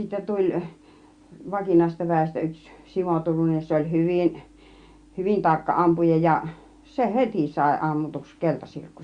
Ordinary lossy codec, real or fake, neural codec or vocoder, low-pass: Opus, 64 kbps; real; none; 9.9 kHz